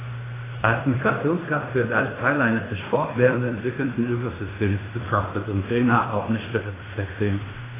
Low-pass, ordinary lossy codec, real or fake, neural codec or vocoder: 3.6 kHz; AAC, 16 kbps; fake; codec, 16 kHz in and 24 kHz out, 0.9 kbps, LongCat-Audio-Codec, fine tuned four codebook decoder